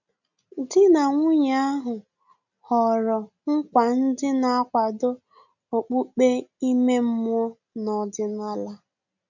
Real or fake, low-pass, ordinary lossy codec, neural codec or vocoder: real; 7.2 kHz; none; none